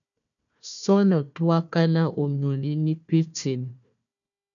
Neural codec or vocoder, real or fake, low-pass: codec, 16 kHz, 1 kbps, FunCodec, trained on Chinese and English, 50 frames a second; fake; 7.2 kHz